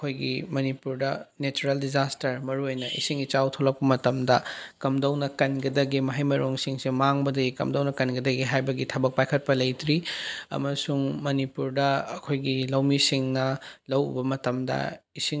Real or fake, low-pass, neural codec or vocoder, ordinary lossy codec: real; none; none; none